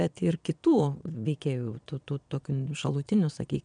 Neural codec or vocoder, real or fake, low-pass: vocoder, 22.05 kHz, 80 mel bands, Vocos; fake; 9.9 kHz